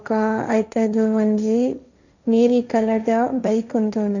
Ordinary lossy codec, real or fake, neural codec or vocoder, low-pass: none; fake; codec, 16 kHz, 1.1 kbps, Voila-Tokenizer; 7.2 kHz